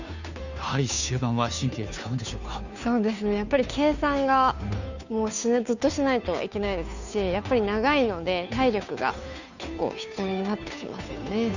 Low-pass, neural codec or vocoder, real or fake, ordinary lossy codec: 7.2 kHz; codec, 16 kHz, 2 kbps, FunCodec, trained on Chinese and English, 25 frames a second; fake; none